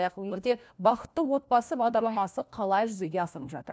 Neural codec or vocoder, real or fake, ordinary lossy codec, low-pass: codec, 16 kHz, 1 kbps, FunCodec, trained on LibriTTS, 50 frames a second; fake; none; none